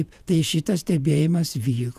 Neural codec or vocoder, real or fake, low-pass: vocoder, 48 kHz, 128 mel bands, Vocos; fake; 14.4 kHz